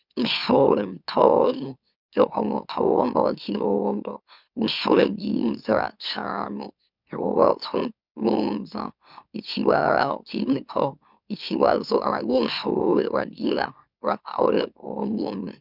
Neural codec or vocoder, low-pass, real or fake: autoencoder, 44.1 kHz, a latent of 192 numbers a frame, MeloTTS; 5.4 kHz; fake